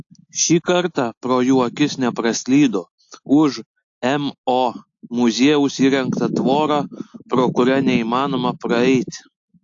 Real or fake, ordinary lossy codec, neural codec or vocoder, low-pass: real; AAC, 48 kbps; none; 7.2 kHz